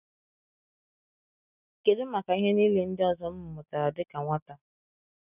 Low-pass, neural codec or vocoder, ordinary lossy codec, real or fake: 3.6 kHz; none; none; real